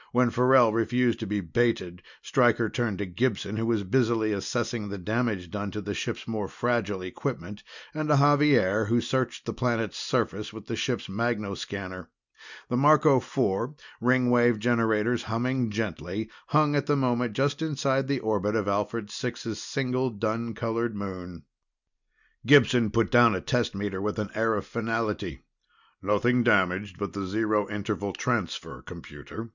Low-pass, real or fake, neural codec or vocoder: 7.2 kHz; real; none